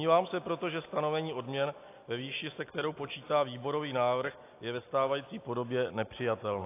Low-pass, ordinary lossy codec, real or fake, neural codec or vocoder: 3.6 kHz; AAC, 24 kbps; real; none